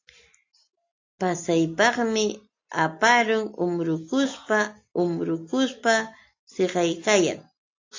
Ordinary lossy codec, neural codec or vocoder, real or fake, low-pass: AAC, 48 kbps; none; real; 7.2 kHz